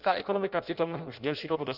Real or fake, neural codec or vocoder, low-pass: fake; codec, 16 kHz in and 24 kHz out, 0.6 kbps, FireRedTTS-2 codec; 5.4 kHz